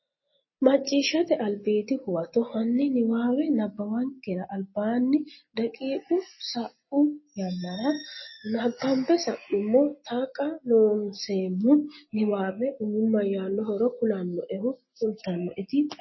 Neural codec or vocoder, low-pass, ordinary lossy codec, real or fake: none; 7.2 kHz; MP3, 24 kbps; real